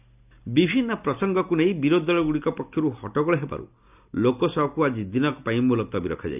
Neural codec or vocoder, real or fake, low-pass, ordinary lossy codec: none; real; 3.6 kHz; none